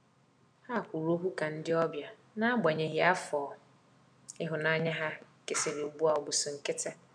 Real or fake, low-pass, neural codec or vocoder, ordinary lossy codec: real; 9.9 kHz; none; none